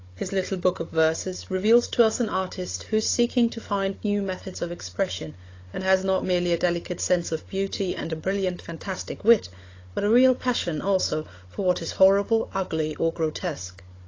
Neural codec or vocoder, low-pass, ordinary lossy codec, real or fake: codec, 16 kHz, 16 kbps, FunCodec, trained on Chinese and English, 50 frames a second; 7.2 kHz; AAC, 32 kbps; fake